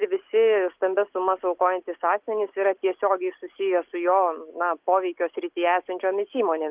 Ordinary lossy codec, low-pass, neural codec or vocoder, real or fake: Opus, 32 kbps; 3.6 kHz; none; real